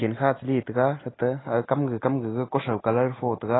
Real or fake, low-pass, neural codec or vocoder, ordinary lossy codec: real; 7.2 kHz; none; AAC, 16 kbps